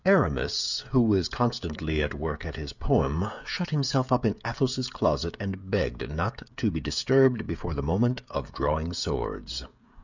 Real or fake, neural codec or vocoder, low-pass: fake; codec, 16 kHz, 16 kbps, FreqCodec, smaller model; 7.2 kHz